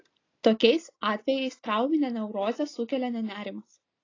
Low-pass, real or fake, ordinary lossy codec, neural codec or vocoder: 7.2 kHz; real; AAC, 32 kbps; none